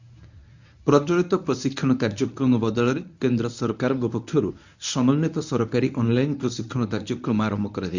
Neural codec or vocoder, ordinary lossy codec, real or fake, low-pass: codec, 24 kHz, 0.9 kbps, WavTokenizer, medium speech release version 1; none; fake; 7.2 kHz